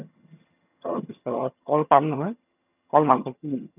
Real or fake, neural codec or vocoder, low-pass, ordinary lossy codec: fake; vocoder, 22.05 kHz, 80 mel bands, HiFi-GAN; 3.6 kHz; none